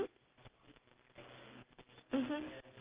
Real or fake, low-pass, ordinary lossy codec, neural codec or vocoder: real; 3.6 kHz; Opus, 32 kbps; none